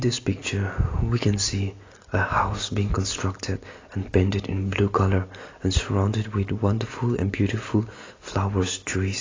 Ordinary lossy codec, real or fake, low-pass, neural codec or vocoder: AAC, 32 kbps; real; 7.2 kHz; none